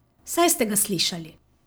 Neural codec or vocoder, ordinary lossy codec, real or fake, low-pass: none; none; real; none